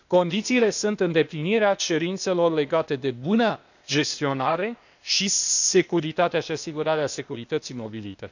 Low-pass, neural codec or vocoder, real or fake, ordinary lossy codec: 7.2 kHz; codec, 16 kHz, 0.8 kbps, ZipCodec; fake; none